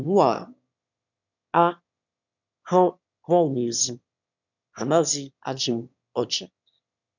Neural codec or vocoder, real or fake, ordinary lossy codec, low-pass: autoencoder, 22.05 kHz, a latent of 192 numbers a frame, VITS, trained on one speaker; fake; none; 7.2 kHz